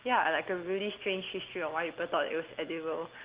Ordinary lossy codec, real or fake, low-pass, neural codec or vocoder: Opus, 16 kbps; real; 3.6 kHz; none